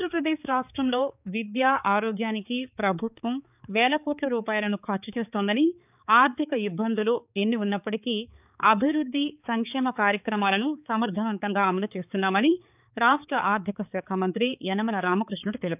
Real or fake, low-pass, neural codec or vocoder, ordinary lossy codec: fake; 3.6 kHz; codec, 16 kHz, 4 kbps, X-Codec, HuBERT features, trained on balanced general audio; none